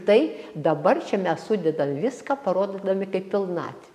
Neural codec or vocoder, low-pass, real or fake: none; 14.4 kHz; real